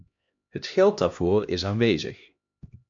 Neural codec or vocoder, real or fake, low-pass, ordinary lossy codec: codec, 16 kHz, 1 kbps, X-Codec, HuBERT features, trained on LibriSpeech; fake; 7.2 kHz; MP3, 48 kbps